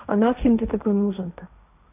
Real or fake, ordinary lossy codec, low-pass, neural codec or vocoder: fake; none; 3.6 kHz; codec, 16 kHz, 1.1 kbps, Voila-Tokenizer